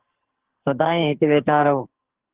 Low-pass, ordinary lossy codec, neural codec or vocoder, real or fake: 3.6 kHz; Opus, 16 kbps; codec, 44.1 kHz, 2.6 kbps, SNAC; fake